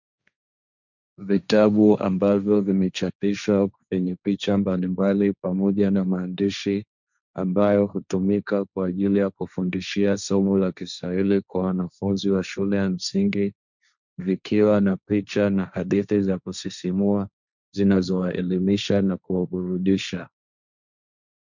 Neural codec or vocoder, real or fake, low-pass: codec, 16 kHz, 1.1 kbps, Voila-Tokenizer; fake; 7.2 kHz